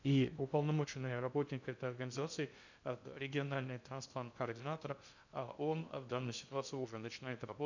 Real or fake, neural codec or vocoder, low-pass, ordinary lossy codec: fake; codec, 16 kHz in and 24 kHz out, 0.6 kbps, FocalCodec, streaming, 2048 codes; 7.2 kHz; none